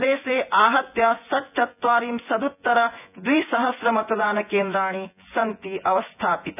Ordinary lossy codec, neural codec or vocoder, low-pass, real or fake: none; vocoder, 24 kHz, 100 mel bands, Vocos; 3.6 kHz; fake